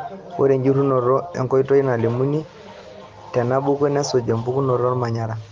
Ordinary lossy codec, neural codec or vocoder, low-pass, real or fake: Opus, 24 kbps; none; 7.2 kHz; real